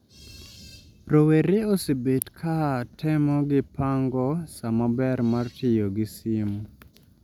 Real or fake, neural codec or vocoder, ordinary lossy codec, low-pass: real; none; none; 19.8 kHz